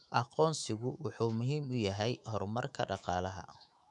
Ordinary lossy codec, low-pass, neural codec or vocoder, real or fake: none; 10.8 kHz; autoencoder, 48 kHz, 128 numbers a frame, DAC-VAE, trained on Japanese speech; fake